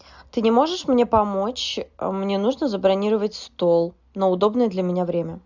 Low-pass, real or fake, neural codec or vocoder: 7.2 kHz; real; none